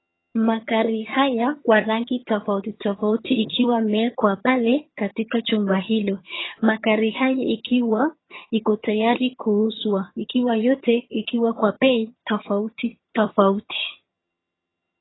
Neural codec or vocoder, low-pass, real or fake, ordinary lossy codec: vocoder, 22.05 kHz, 80 mel bands, HiFi-GAN; 7.2 kHz; fake; AAC, 16 kbps